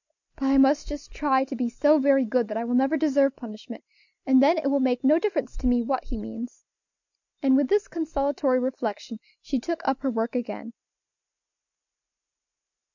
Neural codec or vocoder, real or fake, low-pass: none; real; 7.2 kHz